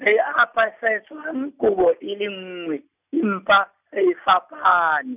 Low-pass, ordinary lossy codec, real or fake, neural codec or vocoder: 3.6 kHz; none; real; none